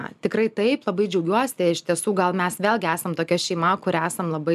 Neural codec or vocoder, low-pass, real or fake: none; 14.4 kHz; real